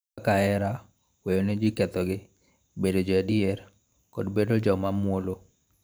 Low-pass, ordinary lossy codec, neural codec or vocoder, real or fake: none; none; vocoder, 44.1 kHz, 128 mel bands every 512 samples, BigVGAN v2; fake